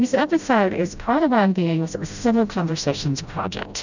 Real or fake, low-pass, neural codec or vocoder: fake; 7.2 kHz; codec, 16 kHz, 0.5 kbps, FreqCodec, smaller model